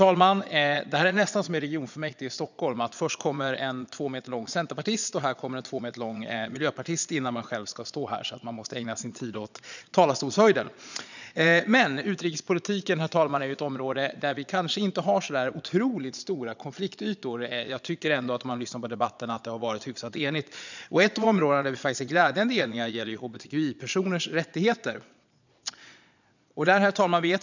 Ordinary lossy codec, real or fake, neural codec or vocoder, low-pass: none; fake; vocoder, 22.05 kHz, 80 mel bands, Vocos; 7.2 kHz